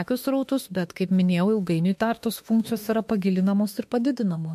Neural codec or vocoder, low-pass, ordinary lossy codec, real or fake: autoencoder, 48 kHz, 32 numbers a frame, DAC-VAE, trained on Japanese speech; 14.4 kHz; MP3, 64 kbps; fake